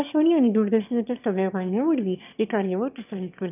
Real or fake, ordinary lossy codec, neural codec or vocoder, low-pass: fake; none; autoencoder, 22.05 kHz, a latent of 192 numbers a frame, VITS, trained on one speaker; 3.6 kHz